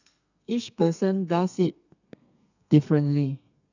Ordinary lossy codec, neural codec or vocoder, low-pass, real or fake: none; codec, 32 kHz, 1.9 kbps, SNAC; 7.2 kHz; fake